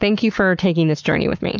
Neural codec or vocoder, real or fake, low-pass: codec, 44.1 kHz, 7.8 kbps, Pupu-Codec; fake; 7.2 kHz